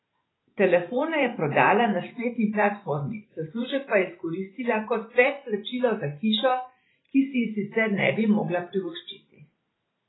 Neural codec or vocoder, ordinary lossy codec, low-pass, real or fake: vocoder, 44.1 kHz, 128 mel bands every 512 samples, BigVGAN v2; AAC, 16 kbps; 7.2 kHz; fake